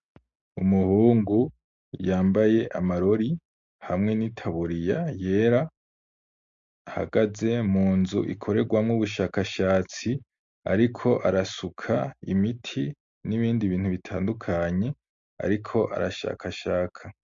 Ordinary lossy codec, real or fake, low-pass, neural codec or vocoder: MP3, 48 kbps; real; 7.2 kHz; none